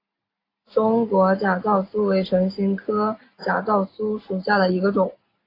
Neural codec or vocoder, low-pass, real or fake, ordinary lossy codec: none; 5.4 kHz; real; AAC, 24 kbps